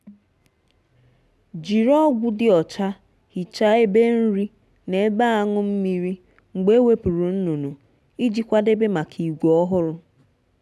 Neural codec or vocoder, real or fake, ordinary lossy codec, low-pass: none; real; none; none